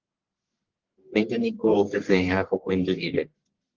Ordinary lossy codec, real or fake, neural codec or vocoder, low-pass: Opus, 16 kbps; fake; codec, 44.1 kHz, 1.7 kbps, Pupu-Codec; 7.2 kHz